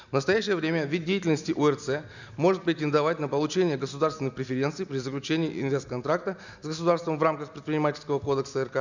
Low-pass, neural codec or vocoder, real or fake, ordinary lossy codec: 7.2 kHz; none; real; none